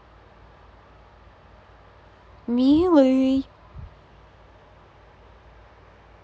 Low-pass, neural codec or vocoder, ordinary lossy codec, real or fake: none; none; none; real